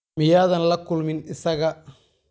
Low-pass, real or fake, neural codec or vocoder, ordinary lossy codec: none; real; none; none